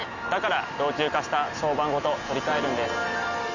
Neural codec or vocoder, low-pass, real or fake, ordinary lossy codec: none; 7.2 kHz; real; none